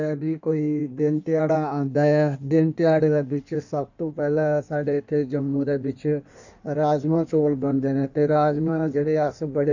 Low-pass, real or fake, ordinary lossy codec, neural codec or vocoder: 7.2 kHz; fake; none; codec, 16 kHz in and 24 kHz out, 1.1 kbps, FireRedTTS-2 codec